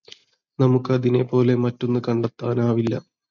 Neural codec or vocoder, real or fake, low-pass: none; real; 7.2 kHz